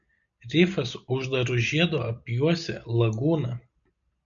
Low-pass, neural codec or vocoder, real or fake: 7.2 kHz; none; real